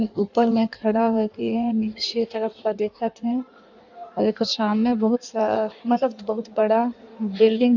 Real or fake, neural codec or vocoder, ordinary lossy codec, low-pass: fake; codec, 16 kHz in and 24 kHz out, 1.1 kbps, FireRedTTS-2 codec; none; 7.2 kHz